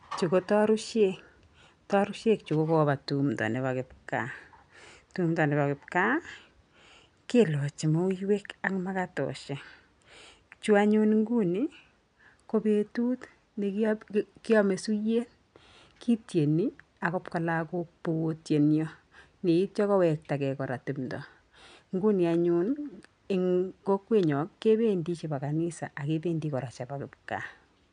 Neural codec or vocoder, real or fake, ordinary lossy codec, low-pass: none; real; none; 9.9 kHz